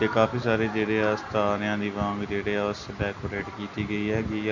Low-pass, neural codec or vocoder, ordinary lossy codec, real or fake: 7.2 kHz; none; none; real